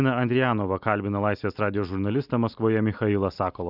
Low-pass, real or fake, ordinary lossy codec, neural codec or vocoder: 5.4 kHz; real; Opus, 64 kbps; none